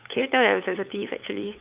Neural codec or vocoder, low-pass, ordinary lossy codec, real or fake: codec, 16 kHz, 8 kbps, FunCodec, trained on LibriTTS, 25 frames a second; 3.6 kHz; Opus, 24 kbps; fake